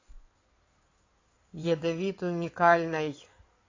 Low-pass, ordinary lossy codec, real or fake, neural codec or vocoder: 7.2 kHz; AAC, 32 kbps; fake; codec, 16 kHz in and 24 kHz out, 2.2 kbps, FireRedTTS-2 codec